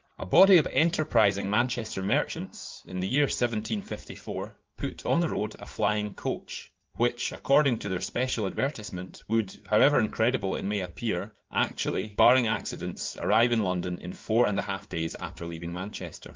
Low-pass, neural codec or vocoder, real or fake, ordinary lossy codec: 7.2 kHz; codec, 16 kHz in and 24 kHz out, 2.2 kbps, FireRedTTS-2 codec; fake; Opus, 32 kbps